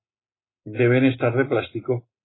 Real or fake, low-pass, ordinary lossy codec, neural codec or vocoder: real; 7.2 kHz; AAC, 16 kbps; none